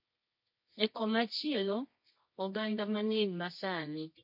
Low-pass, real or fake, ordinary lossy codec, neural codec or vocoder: 5.4 kHz; fake; MP3, 32 kbps; codec, 24 kHz, 0.9 kbps, WavTokenizer, medium music audio release